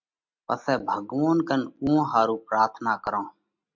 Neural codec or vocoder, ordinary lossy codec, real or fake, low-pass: none; MP3, 64 kbps; real; 7.2 kHz